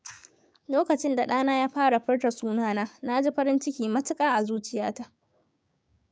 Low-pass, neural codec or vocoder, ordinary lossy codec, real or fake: none; codec, 16 kHz, 6 kbps, DAC; none; fake